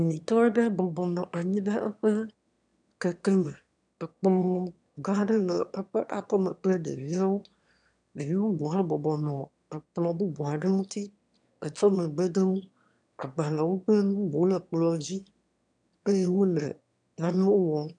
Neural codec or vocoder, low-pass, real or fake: autoencoder, 22.05 kHz, a latent of 192 numbers a frame, VITS, trained on one speaker; 9.9 kHz; fake